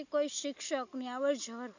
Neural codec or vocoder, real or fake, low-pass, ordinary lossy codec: none; real; 7.2 kHz; none